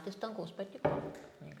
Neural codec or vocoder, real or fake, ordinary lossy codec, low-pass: none; real; MP3, 96 kbps; 19.8 kHz